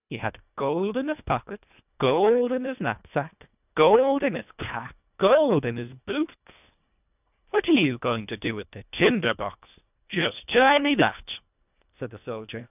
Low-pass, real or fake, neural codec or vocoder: 3.6 kHz; fake; codec, 24 kHz, 1.5 kbps, HILCodec